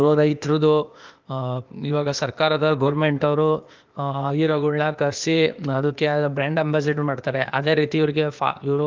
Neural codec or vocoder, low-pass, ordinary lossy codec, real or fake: codec, 16 kHz, 0.8 kbps, ZipCodec; 7.2 kHz; Opus, 24 kbps; fake